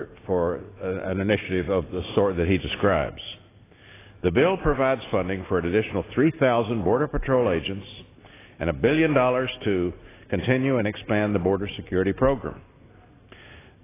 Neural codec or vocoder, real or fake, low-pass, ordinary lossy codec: none; real; 3.6 kHz; AAC, 16 kbps